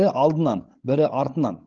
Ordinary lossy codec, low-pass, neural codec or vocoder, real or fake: Opus, 16 kbps; 7.2 kHz; codec, 16 kHz, 16 kbps, FreqCodec, larger model; fake